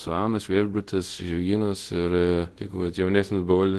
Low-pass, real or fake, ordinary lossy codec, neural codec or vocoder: 10.8 kHz; fake; Opus, 16 kbps; codec, 24 kHz, 0.5 kbps, DualCodec